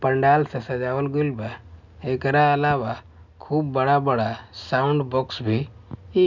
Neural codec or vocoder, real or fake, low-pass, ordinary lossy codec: none; real; 7.2 kHz; none